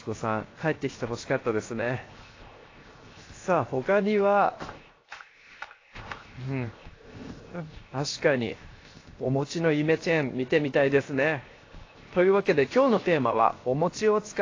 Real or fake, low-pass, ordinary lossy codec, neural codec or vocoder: fake; 7.2 kHz; AAC, 32 kbps; codec, 16 kHz, 0.7 kbps, FocalCodec